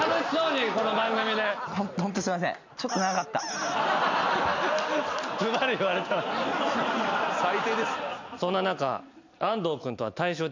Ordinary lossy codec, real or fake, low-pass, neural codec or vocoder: MP3, 64 kbps; real; 7.2 kHz; none